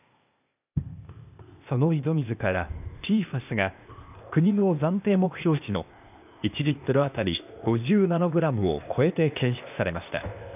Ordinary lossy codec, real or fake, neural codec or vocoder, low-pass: none; fake; codec, 16 kHz, 0.8 kbps, ZipCodec; 3.6 kHz